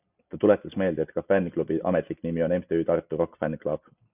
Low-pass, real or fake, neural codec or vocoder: 3.6 kHz; real; none